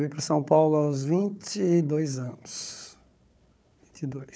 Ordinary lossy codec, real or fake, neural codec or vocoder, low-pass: none; fake; codec, 16 kHz, 4 kbps, FunCodec, trained on Chinese and English, 50 frames a second; none